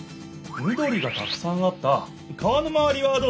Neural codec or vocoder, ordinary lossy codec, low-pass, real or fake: none; none; none; real